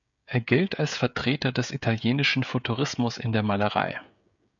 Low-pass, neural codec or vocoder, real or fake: 7.2 kHz; codec, 16 kHz, 16 kbps, FreqCodec, smaller model; fake